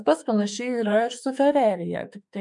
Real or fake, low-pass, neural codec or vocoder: fake; 10.8 kHz; autoencoder, 48 kHz, 32 numbers a frame, DAC-VAE, trained on Japanese speech